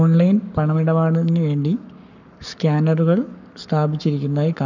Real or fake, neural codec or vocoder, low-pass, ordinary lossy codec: fake; codec, 44.1 kHz, 7.8 kbps, Pupu-Codec; 7.2 kHz; none